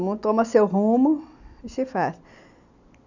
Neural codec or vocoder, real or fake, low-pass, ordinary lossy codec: none; real; 7.2 kHz; none